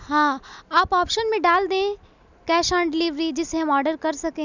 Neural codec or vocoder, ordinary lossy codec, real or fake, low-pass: none; none; real; 7.2 kHz